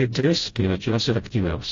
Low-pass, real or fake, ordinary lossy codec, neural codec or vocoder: 7.2 kHz; fake; AAC, 32 kbps; codec, 16 kHz, 0.5 kbps, FreqCodec, smaller model